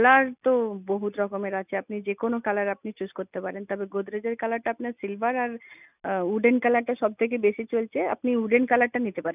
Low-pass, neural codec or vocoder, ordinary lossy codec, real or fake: 3.6 kHz; none; none; real